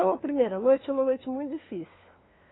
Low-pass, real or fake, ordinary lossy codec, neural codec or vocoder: 7.2 kHz; fake; AAC, 16 kbps; codec, 16 kHz, 2 kbps, FunCodec, trained on LibriTTS, 25 frames a second